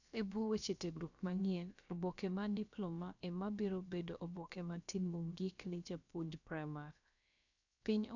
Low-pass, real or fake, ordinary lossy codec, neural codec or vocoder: 7.2 kHz; fake; none; codec, 16 kHz, about 1 kbps, DyCAST, with the encoder's durations